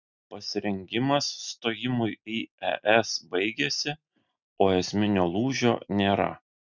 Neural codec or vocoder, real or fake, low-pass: none; real; 7.2 kHz